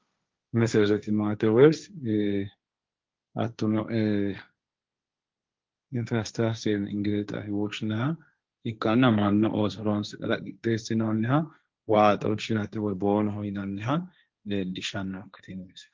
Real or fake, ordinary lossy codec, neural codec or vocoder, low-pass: fake; Opus, 32 kbps; codec, 16 kHz, 1.1 kbps, Voila-Tokenizer; 7.2 kHz